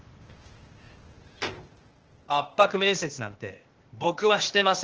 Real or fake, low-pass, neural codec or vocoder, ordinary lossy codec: fake; 7.2 kHz; codec, 16 kHz, 0.8 kbps, ZipCodec; Opus, 16 kbps